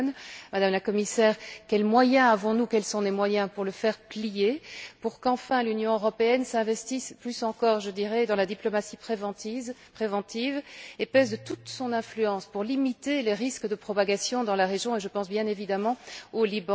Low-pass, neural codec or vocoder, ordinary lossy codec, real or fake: none; none; none; real